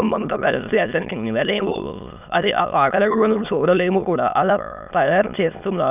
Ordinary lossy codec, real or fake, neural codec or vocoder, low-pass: none; fake; autoencoder, 22.05 kHz, a latent of 192 numbers a frame, VITS, trained on many speakers; 3.6 kHz